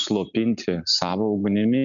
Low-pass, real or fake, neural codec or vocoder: 7.2 kHz; real; none